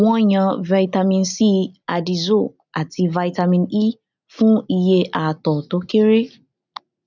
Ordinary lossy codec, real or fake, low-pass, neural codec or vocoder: none; real; 7.2 kHz; none